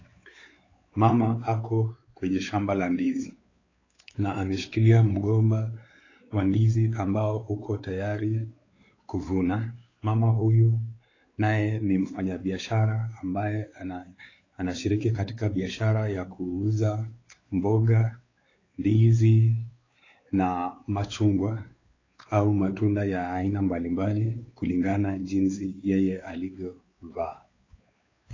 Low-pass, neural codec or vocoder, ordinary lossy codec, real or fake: 7.2 kHz; codec, 16 kHz, 4 kbps, X-Codec, WavLM features, trained on Multilingual LibriSpeech; AAC, 32 kbps; fake